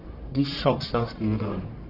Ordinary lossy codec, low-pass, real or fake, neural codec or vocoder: none; 5.4 kHz; fake; codec, 44.1 kHz, 1.7 kbps, Pupu-Codec